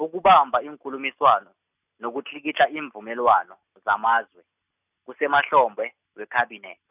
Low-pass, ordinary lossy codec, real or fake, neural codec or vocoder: 3.6 kHz; none; real; none